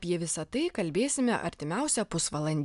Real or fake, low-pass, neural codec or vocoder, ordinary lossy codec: real; 10.8 kHz; none; MP3, 96 kbps